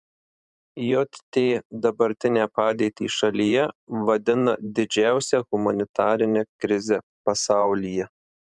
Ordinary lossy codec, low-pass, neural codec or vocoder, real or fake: MP3, 96 kbps; 10.8 kHz; vocoder, 44.1 kHz, 128 mel bands every 512 samples, BigVGAN v2; fake